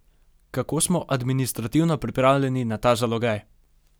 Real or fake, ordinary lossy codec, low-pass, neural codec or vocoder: real; none; none; none